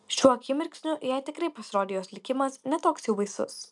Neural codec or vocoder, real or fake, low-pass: none; real; 10.8 kHz